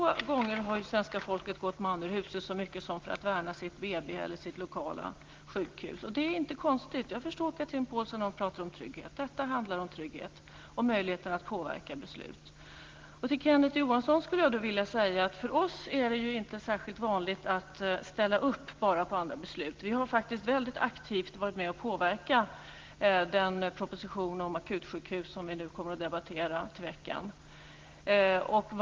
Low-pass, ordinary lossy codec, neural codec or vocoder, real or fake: 7.2 kHz; Opus, 16 kbps; none; real